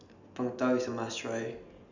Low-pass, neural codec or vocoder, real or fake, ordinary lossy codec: 7.2 kHz; none; real; none